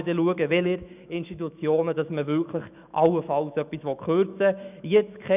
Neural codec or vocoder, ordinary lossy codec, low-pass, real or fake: autoencoder, 48 kHz, 128 numbers a frame, DAC-VAE, trained on Japanese speech; none; 3.6 kHz; fake